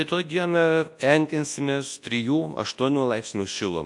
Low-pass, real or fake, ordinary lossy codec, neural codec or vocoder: 10.8 kHz; fake; AAC, 64 kbps; codec, 24 kHz, 0.9 kbps, WavTokenizer, large speech release